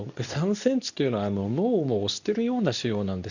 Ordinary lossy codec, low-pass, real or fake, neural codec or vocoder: none; 7.2 kHz; fake; codec, 24 kHz, 0.9 kbps, WavTokenizer, medium speech release version 2